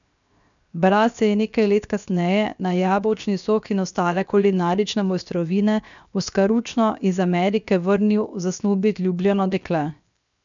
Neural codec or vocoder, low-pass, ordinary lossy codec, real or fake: codec, 16 kHz, 0.7 kbps, FocalCodec; 7.2 kHz; none; fake